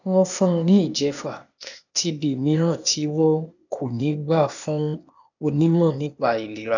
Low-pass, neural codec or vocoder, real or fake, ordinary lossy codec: 7.2 kHz; codec, 16 kHz, 0.8 kbps, ZipCodec; fake; none